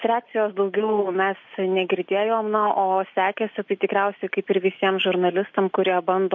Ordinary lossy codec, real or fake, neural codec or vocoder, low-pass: MP3, 48 kbps; real; none; 7.2 kHz